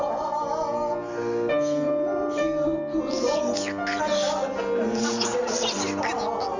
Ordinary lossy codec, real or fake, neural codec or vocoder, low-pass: Opus, 64 kbps; real; none; 7.2 kHz